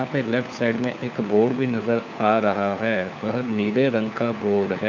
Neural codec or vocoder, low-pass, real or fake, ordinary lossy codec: codec, 16 kHz, 4 kbps, FunCodec, trained on LibriTTS, 50 frames a second; 7.2 kHz; fake; none